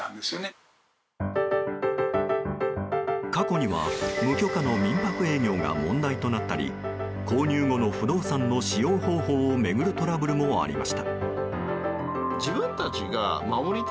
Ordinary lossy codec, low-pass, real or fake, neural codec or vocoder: none; none; real; none